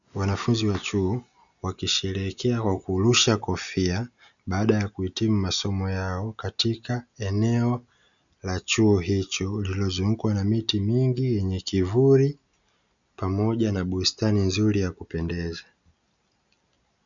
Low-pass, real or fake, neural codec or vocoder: 7.2 kHz; real; none